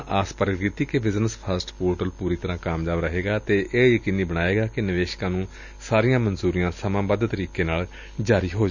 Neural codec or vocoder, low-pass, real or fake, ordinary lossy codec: none; 7.2 kHz; real; none